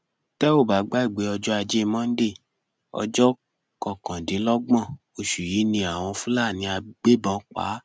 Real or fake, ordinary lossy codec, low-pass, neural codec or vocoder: real; none; none; none